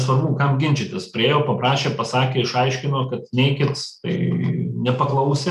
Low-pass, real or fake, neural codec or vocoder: 14.4 kHz; real; none